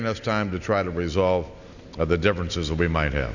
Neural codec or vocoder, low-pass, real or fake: none; 7.2 kHz; real